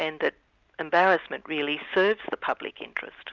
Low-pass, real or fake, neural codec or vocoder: 7.2 kHz; real; none